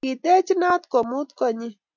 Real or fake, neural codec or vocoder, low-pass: real; none; 7.2 kHz